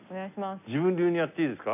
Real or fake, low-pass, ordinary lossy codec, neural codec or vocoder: real; 3.6 kHz; none; none